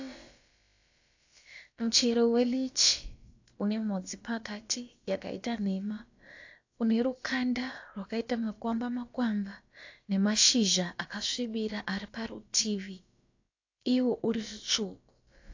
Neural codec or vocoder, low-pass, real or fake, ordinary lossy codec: codec, 16 kHz, about 1 kbps, DyCAST, with the encoder's durations; 7.2 kHz; fake; AAC, 48 kbps